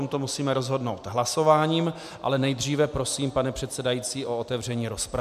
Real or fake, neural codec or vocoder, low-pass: real; none; 14.4 kHz